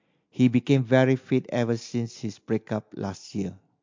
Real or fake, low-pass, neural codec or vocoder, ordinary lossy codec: real; 7.2 kHz; none; MP3, 48 kbps